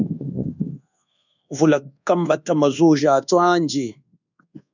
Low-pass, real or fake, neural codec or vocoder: 7.2 kHz; fake; codec, 24 kHz, 1.2 kbps, DualCodec